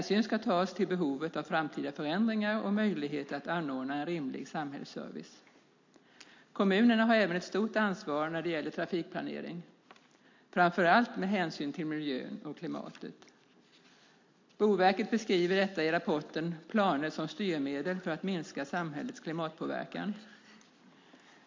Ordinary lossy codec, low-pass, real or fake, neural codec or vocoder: MP3, 48 kbps; 7.2 kHz; real; none